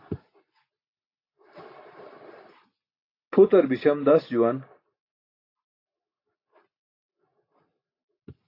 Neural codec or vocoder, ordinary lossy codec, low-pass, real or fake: none; AAC, 32 kbps; 5.4 kHz; real